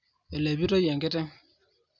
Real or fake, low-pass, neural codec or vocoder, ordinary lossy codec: real; 7.2 kHz; none; none